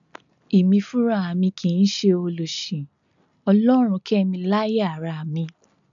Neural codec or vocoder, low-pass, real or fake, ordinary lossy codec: none; 7.2 kHz; real; none